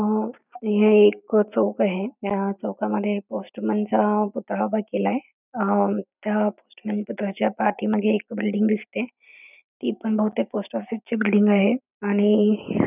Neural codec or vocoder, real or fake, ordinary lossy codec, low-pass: none; real; none; 3.6 kHz